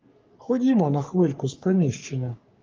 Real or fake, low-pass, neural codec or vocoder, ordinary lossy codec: fake; 7.2 kHz; codec, 44.1 kHz, 3.4 kbps, Pupu-Codec; Opus, 32 kbps